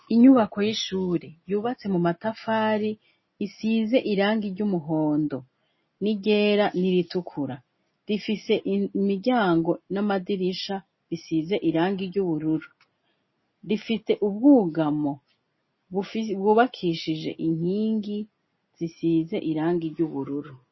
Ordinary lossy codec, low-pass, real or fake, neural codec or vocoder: MP3, 24 kbps; 7.2 kHz; real; none